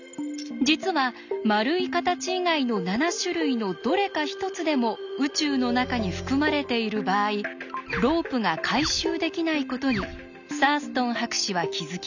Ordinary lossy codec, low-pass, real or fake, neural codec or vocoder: none; 7.2 kHz; real; none